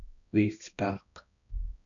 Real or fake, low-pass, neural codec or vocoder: fake; 7.2 kHz; codec, 16 kHz, 1 kbps, X-Codec, HuBERT features, trained on balanced general audio